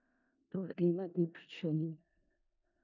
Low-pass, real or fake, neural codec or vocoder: 7.2 kHz; fake; codec, 16 kHz in and 24 kHz out, 0.4 kbps, LongCat-Audio-Codec, four codebook decoder